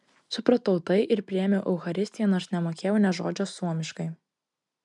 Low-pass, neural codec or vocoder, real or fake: 10.8 kHz; none; real